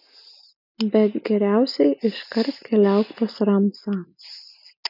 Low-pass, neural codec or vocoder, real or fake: 5.4 kHz; none; real